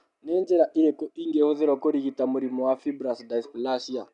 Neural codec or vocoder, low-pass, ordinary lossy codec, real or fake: none; 10.8 kHz; none; real